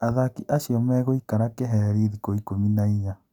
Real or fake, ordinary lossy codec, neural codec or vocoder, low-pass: real; none; none; 19.8 kHz